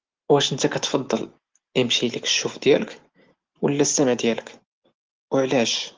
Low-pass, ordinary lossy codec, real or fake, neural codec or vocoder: 7.2 kHz; Opus, 16 kbps; real; none